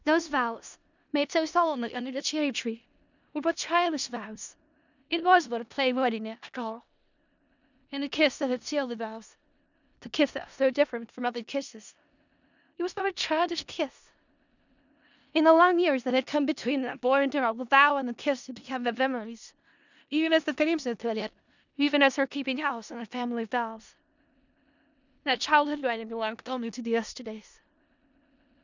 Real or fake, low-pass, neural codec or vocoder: fake; 7.2 kHz; codec, 16 kHz in and 24 kHz out, 0.4 kbps, LongCat-Audio-Codec, four codebook decoder